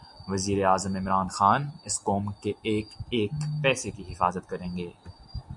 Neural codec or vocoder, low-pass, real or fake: none; 10.8 kHz; real